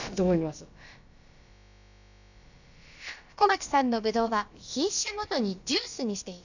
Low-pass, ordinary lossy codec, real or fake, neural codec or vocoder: 7.2 kHz; none; fake; codec, 16 kHz, about 1 kbps, DyCAST, with the encoder's durations